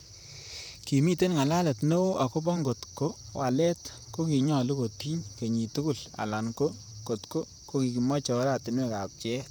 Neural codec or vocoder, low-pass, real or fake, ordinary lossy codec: vocoder, 44.1 kHz, 128 mel bands, Pupu-Vocoder; none; fake; none